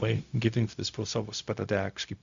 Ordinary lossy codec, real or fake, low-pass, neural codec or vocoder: Opus, 64 kbps; fake; 7.2 kHz; codec, 16 kHz, 0.4 kbps, LongCat-Audio-Codec